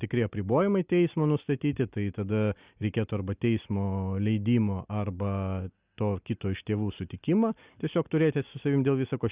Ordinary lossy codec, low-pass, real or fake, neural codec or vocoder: Opus, 64 kbps; 3.6 kHz; real; none